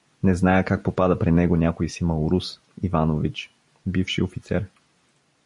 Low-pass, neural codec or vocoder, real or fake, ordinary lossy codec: 10.8 kHz; none; real; MP3, 48 kbps